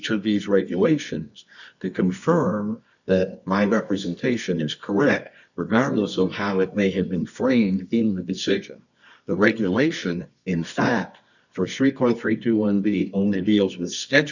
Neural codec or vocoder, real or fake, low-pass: codec, 24 kHz, 0.9 kbps, WavTokenizer, medium music audio release; fake; 7.2 kHz